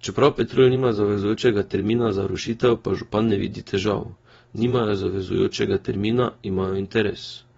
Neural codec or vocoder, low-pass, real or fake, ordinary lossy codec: vocoder, 24 kHz, 100 mel bands, Vocos; 10.8 kHz; fake; AAC, 24 kbps